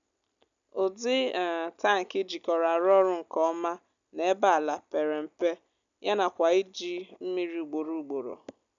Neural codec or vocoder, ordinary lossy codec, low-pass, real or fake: none; none; 7.2 kHz; real